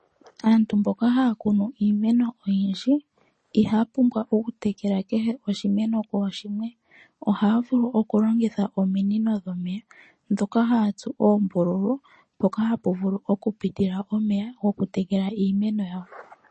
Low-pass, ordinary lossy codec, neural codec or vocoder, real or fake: 10.8 kHz; MP3, 32 kbps; none; real